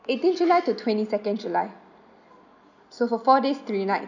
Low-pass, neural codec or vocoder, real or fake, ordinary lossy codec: 7.2 kHz; none; real; none